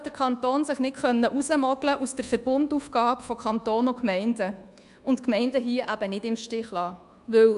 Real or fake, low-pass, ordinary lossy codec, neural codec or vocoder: fake; 10.8 kHz; none; codec, 24 kHz, 1.2 kbps, DualCodec